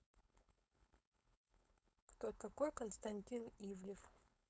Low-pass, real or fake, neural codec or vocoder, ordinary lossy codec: none; fake; codec, 16 kHz, 4.8 kbps, FACodec; none